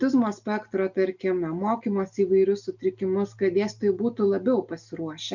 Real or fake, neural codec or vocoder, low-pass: real; none; 7.2 kHz